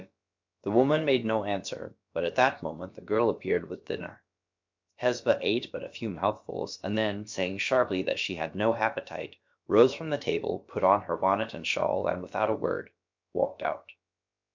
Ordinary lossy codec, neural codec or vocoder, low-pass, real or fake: MP3, 64 kbps; codec, 16 kHz, about 1 kbps, DyCAST, with the encoder's durations; 7.2 kHz; fake